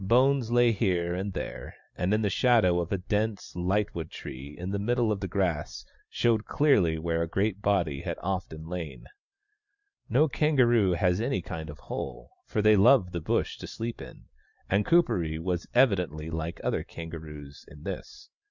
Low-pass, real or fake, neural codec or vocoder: 7.2 kHz; real; none